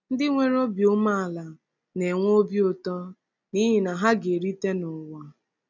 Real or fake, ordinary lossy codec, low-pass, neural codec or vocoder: real; none; 7.2 kHz; none